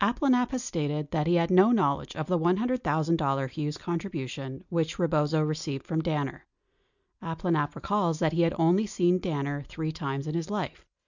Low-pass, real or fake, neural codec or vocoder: 7.2 kHz; real; none